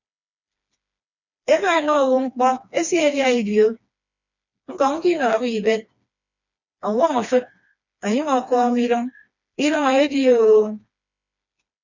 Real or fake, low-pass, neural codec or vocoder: fake; 7.2 kHz; codec, 16 kHz, 2 kbps, FreqCodec, smaller model